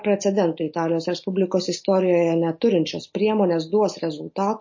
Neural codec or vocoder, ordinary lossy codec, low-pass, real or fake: none; MP3, 32 kbps; 7.2 kHz; real